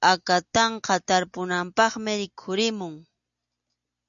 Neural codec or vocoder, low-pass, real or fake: none; 7.2 kHz; real